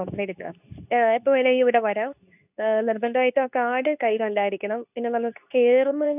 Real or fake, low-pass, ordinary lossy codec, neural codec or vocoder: fake; 3.6 kHz; none; codec, 24 kHz, 0.9 kbps, WavTokenizer, medium speech release version 2